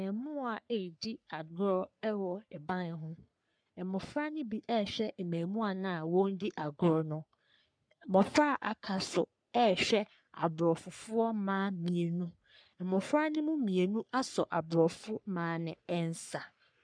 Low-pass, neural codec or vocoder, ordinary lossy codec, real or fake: 9.9 kHz; codec, 44.1 kHz, 3.4 kbps, Pupu-Codec; MP3, 96 kbps; fake